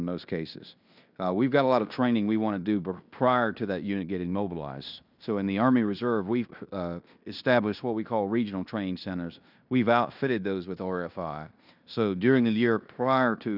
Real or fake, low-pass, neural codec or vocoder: fake; 5.4 kHz; codec, 16 kHz in and 24 kHz out, 0.9 kbps, LongCat-Audio-Codec, fine tuned four codebook decoder